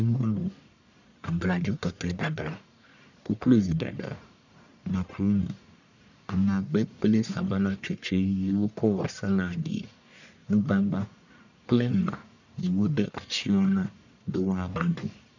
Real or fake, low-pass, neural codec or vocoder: fake; 7.2 kHz; codec, 44.1 kHz, 1.7 kbps, Pupu-Codec